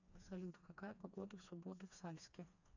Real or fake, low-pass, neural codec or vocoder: fake; 7.2 kHz; codec, 16 kHz, 2 kbps, FreqCodec, smaller model